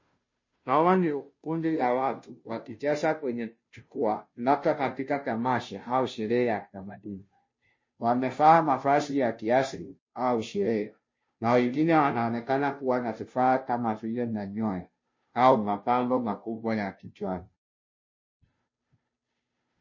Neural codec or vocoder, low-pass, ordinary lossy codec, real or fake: codec, 16 kHz, 0.5 kbps, FunCodec, trained on Chinese and English, 25 frames a second; 7.2 kHz; MP3, 32 kbps; fake